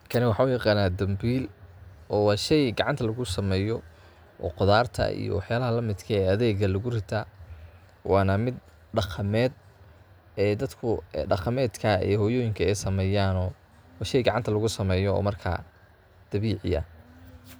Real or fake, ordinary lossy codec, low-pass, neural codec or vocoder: real; none; none; none